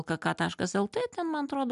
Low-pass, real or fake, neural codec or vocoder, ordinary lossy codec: 10.8 kHz; real; none; AAC, 96 kbps